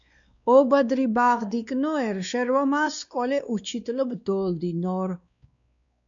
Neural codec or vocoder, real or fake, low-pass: codec, 16 kHz, 2 kbps, X-Codec, WavLM features, trained on Multilingual LibriSpeech; fake; 7.2 kHz